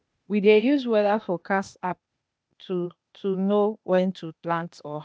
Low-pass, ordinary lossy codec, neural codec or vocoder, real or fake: none; none; codec, 16 kHz, 0.8 kbps, ZipCodec; fake